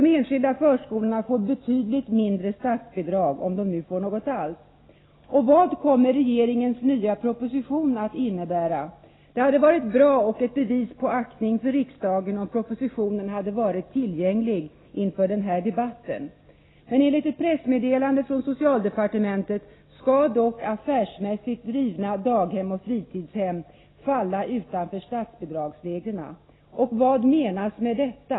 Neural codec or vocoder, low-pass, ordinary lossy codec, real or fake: none; 7.2 kHz; AAC, 16 kbps; real